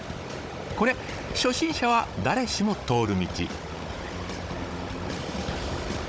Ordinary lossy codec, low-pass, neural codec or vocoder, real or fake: none; none; codec, 16 kHz, 16 kbps, FunCodec, trained on Chinese and English, 50 frames a second; fake